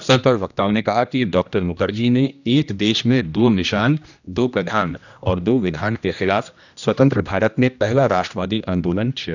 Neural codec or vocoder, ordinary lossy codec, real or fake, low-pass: codec, 16 kHz, 1 kbps, X-Codec, HuBERT features, trained on general audio; none; fake; 7.2 kHz